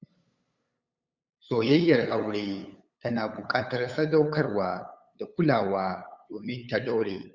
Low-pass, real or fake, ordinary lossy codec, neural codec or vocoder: 7.2 kHz; fake; Opus, 64 kbps; codec, 16 kHz, 8 kbps, FunCodec, trained on LibriTTS, 25 frames a second